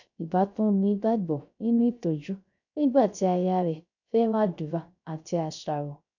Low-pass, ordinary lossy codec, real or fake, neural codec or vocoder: 7.2 kHz; none; fake; codec, 16 kHz, 0.3 kbps, FocalCodec